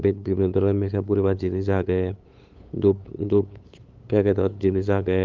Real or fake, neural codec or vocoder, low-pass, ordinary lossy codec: fake; codec, 16 kHz, 8 kbps, FunCodec, trained on LibriTTS, 25 frames a second; 7.2 kHz; Opus, 24 kbps